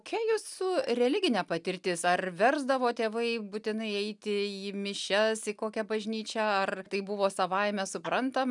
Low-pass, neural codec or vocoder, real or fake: 10.8 kHz; none; real